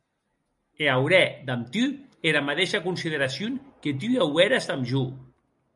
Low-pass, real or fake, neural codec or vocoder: 10.8 kHz; real; none